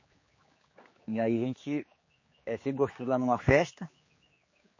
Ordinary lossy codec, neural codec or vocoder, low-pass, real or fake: MP3, 32 kbps; codec, 16 kHz, 4 kbps, X-Codec, HuBERT features, trained on general audio; 7.2 kHz; fake